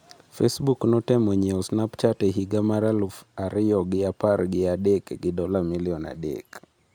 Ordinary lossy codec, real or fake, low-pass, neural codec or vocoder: none; real; none; none